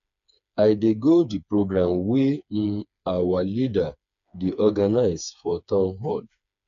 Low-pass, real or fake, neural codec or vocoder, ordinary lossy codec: 7.2 kHz; fake; codec, 16 kHz, 4 kbps, FreqCodec, smaller model; none